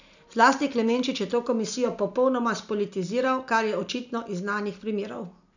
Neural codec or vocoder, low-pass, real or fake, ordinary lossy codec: none; 7.2 kHz; real; none